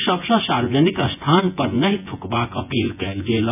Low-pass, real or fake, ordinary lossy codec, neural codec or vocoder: 3.6 kHz; fake; none; vocoder, 24 kHz, 100 mel bands, Vocos